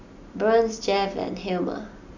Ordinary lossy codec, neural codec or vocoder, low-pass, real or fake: none; none; 7.2 kHz; real